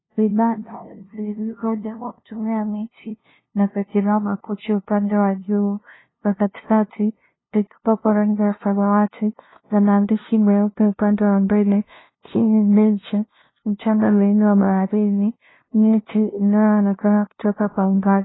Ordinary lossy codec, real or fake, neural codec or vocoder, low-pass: AAC, 16 kbps; fake; codec, 16 kHz, 0.5 kbps, FunCodec, trained on LibriTTS, 25 frames a second; 7.2 kHz